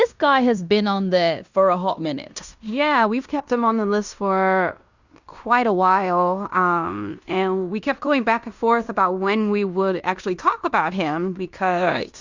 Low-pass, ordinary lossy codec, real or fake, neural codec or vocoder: 7.2 kHz; Opus, 64 kbps; fake; codec, 16 kHz in and 24 kHz out, 0.9 kbps, LongCat-Audio-Codec, fine tuned four codebook decoder